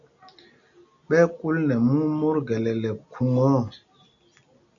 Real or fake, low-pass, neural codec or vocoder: real; 7.2 kHz; none